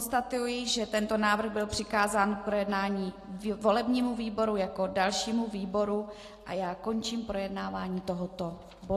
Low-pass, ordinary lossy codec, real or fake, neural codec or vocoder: 14.4 kHz; AAC, 48 kbps; real; none